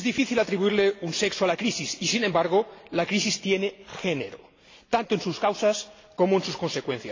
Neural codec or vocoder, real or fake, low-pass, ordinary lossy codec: none; real; 7.2 kHz; AAC, 32 kbps